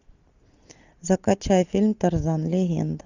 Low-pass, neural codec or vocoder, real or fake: 7.2 kHz; none; real